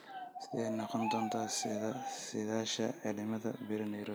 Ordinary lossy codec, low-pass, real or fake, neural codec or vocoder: none; none; real; none